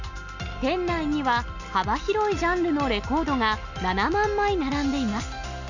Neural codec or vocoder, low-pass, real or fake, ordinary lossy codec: none; 7.2 kHz; real; none